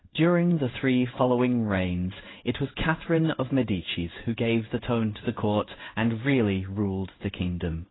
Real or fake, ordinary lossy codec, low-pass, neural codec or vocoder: fake; AAC, 16 kbps; 7.2 kHz; codec, 16 kHz in and 24 kHz out, 1 kbps, XY-Tokenizer